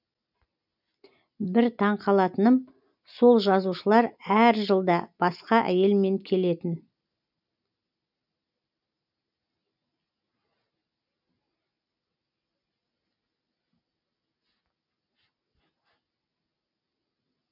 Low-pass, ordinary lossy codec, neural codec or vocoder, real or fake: 5.4 kHz; none; none; real